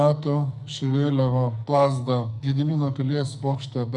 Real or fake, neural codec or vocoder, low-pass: fake; codec, 44.1 kHz, 2.6 kbps, SNAC; 10.8 kHz